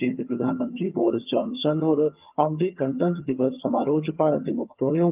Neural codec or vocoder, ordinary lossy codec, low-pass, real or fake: vocoder, 22.05 kHz, 80 mel bands, HiFi-GAN; Opus, 24 kbps; 3.6 kHz; fake